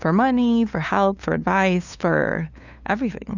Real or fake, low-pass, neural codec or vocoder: fake; 7.2 kHz; codec, 16 kHz, 2 kbps, FunCodec, trained on LibriTTS, 25 frames a second